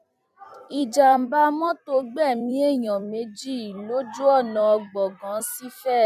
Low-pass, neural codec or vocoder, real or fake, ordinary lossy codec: 14.4 kHz; vocoder, 44.1 kHz, 128 mel bands every 256 samples, BigVGAN v2; fake; none